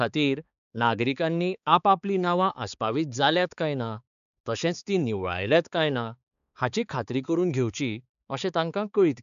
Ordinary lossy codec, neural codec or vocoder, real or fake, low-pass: none; codec, 16 kHz, 4 kbps, X-Codec, HuBERT features, trained on balanced general audio; fake; 7.2 kHz